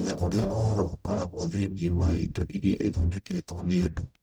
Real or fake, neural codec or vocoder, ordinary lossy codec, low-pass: fake; codec, 44.1 kHz, 0.9 kbps, DAC; none; none